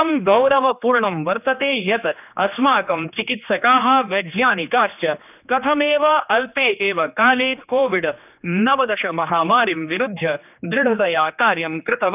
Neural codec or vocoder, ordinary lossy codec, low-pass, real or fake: codec, 16 kHz, 2 kbps, X-Codec, HuBERT features, trained on general audio; none; 3.6 kHz; fake